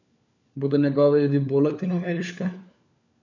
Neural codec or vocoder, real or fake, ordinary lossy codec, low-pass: codec, 16 kHz, 4 kbps, FunCodec, trained on LibriTTS, 50 frames a second; fake; none; 7.2 kHz